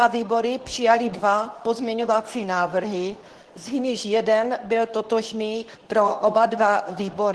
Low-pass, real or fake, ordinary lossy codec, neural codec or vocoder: 10.8 kHz; fake; Opus, 16 kbps; codec, 24 kHz, 0.9 kbps, WavTokenizer, medium speech release version 2